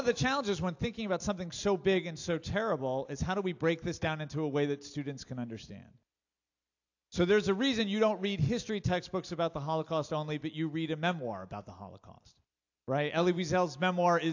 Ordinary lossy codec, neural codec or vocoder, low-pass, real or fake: AAC, 48 kbps; none; 7.2 kHz; real